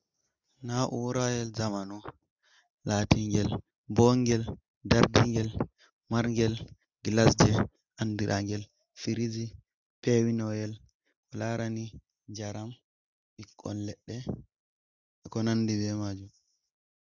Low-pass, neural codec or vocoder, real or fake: 7.2 kHz; none; real